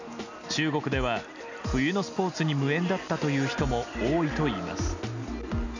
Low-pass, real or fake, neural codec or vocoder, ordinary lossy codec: 7.2 kHz; real; none; none